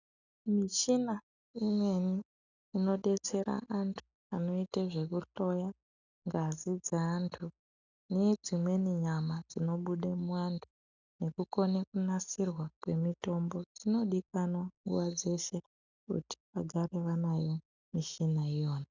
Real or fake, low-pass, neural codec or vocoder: real; 7.2 kHz; none